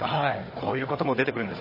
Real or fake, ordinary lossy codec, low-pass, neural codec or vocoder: fake; MP3, 24 kbps; 5.4 kHz; codec, 16 kHz, 4 kbps, FunCodec, trained on Chinese and English, 50 frames a second